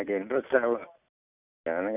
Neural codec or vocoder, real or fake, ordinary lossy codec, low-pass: none; real; none; 3.6 kHz